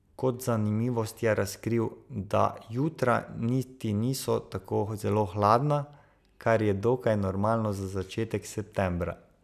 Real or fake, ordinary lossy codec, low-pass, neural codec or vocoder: real; none; 14.4 kHz; none